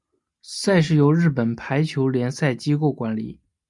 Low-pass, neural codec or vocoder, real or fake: 10.8 kHz; none; real